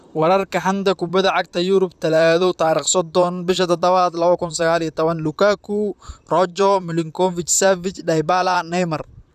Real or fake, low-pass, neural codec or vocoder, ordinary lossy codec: fake; 14.4 kHz; vocoder, 44.1 kHz, 128 mel bands, Pupu-Vocoder; none